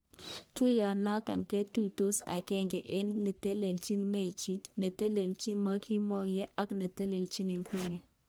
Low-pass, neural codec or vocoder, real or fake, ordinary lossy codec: none; codec, 44.1 kHz, 1.7 kbps, Pupu-Codec; fake; none